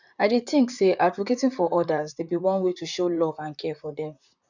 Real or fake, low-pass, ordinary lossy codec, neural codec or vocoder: fake; 7.2 kHz; none; vocoder, 44.1 kHz, 128 mel bands, Pupu-Vocoder